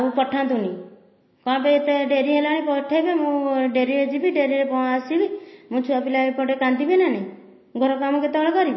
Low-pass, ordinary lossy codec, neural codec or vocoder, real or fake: 7.2 kHz; MP3, 24 kbps; none; real